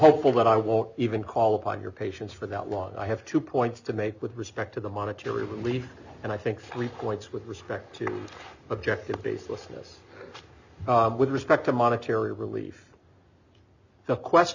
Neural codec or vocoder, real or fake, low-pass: none; real; 7.2 kHz